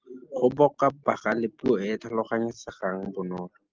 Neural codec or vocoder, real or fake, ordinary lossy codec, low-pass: none; real; Opus, 32 kbps; 7.2 kHz